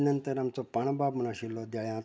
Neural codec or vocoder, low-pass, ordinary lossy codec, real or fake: none; none; none; real